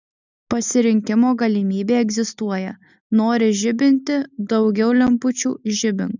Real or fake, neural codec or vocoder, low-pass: real; none; 7.2 kHz